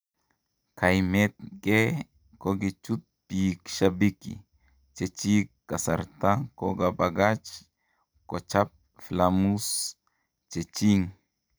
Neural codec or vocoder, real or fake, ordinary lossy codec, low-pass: none; real; none; none